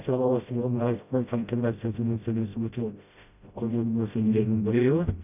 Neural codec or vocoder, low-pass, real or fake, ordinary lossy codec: codec, 16 kHz, 0.5 kbps, FreqCodec, smaller model; 3.6 kHz; fake; none